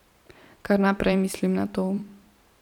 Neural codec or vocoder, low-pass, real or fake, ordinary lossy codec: vocoder, 44.1 kHz, 128 mel bands every 512 samples, BigVGAN v2; 19.8 kHz; fake; none